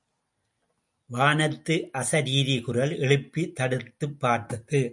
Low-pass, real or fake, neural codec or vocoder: 10.8 kHz; real; none